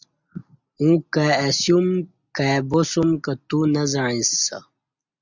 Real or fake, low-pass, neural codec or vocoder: real; 7.2 kHz; none